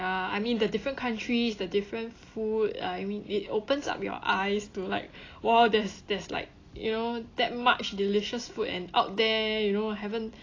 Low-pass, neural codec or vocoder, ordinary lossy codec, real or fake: 7.2 kHz; none; AAC, 32 kbps; real